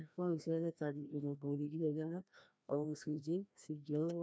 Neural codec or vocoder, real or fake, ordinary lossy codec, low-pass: codec, 16 kHz, 1 kbps, FreqCodec, larger model; fake; none; none